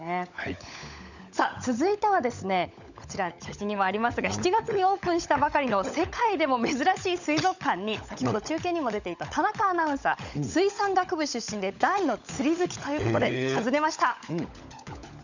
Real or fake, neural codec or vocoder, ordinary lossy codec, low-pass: fake; codec, 16 kHz, 16 kbps, FunCodec, trained on LibriTTS, 50 frames a second; none; 7.2 kHz